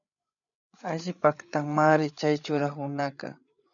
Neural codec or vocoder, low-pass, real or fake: codec, 16 kHz, 8 kbps, FreqCodec, larger model; 7.2 kHz; fake